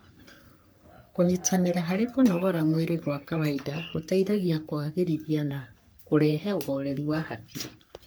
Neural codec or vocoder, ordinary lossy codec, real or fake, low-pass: codec, 44.1 kHz, 3.4 kbps, Pupu-Codec; none; fake; none